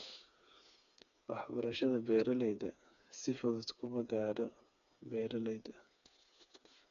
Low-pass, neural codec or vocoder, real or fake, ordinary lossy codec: 7.2 kHz; codec, 16 kHz, 4 kbps, FreqCodec, smaller model; fake; none